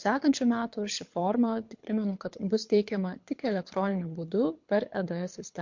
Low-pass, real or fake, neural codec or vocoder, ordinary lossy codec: 7.2 kHz; fake; codec, 24 kHz, 6 kbps, HILCodec; MP3, 48 kbps